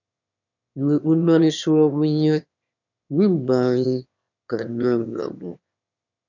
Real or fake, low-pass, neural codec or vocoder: fake; 7.2 kHz; autoencoder, 22.05 kHz, a latent of 192 numbers a frame, VITS, trained on one speaker